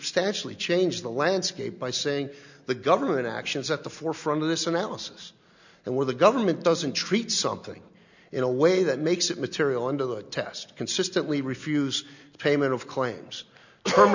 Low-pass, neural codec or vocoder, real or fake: 7.2 kHz; none; real